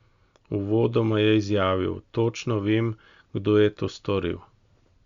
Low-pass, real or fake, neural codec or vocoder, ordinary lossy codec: 7.2 kHz; real; none; Opus, 64 kbps